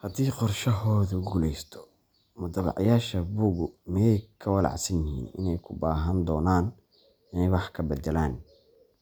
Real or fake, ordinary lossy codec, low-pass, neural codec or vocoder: real; none; none; none